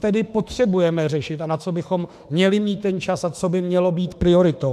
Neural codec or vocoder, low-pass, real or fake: autoencoder, 48 kHz, 32 numbers a frame, DAC-VAE, trained on Japanese speech; 14.4 kHz; fake